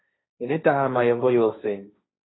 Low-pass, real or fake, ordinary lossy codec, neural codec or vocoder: 7.2 kHz; fake; AAC, 16 kbps; codec, 16 kHz, 1.1 kbps, Voila-Tokenizer